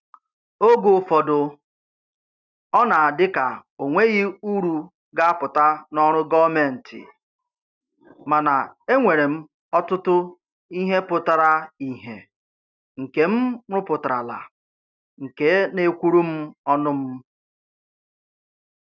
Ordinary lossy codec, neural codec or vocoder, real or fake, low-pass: none; none; real; 7.2 kHz